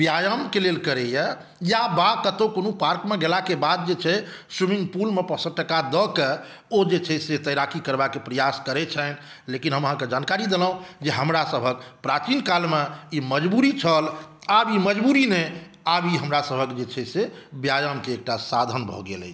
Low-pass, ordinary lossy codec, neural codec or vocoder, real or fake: none; none; none; real